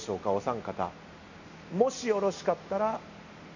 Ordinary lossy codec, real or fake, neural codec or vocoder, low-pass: none; real; none; 7.2 kHz